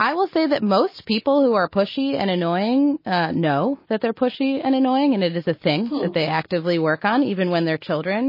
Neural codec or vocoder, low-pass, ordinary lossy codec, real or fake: none; 5.4 kHz; MP3, 24 kbps; real